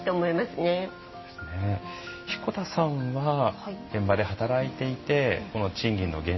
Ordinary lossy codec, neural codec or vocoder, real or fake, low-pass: MP3, 24 kbps; none; real; 7.2 kHz